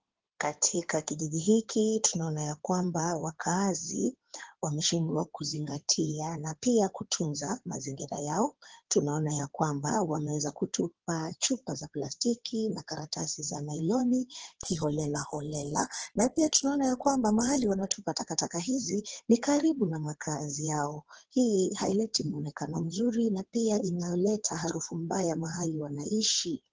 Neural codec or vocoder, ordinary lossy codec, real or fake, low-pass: codec, 16 kHz in and 24 kHz out, 2.2 kbps, FireRedTTS-2 codec; Opus, 16 kbps; fake; 7.2 kHz